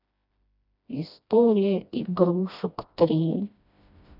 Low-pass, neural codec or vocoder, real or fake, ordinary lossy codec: 5.4 kHz; codec, 16 kHz, 1 kbps, FreqCodec, smaller model; fake; none